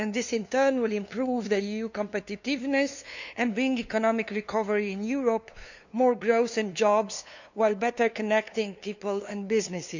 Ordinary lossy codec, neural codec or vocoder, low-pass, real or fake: none; codec, 16 kHz, 2 kbps, FunCodec, trained on LibriTTS, 25 frames a second; 7.2 kHz; fake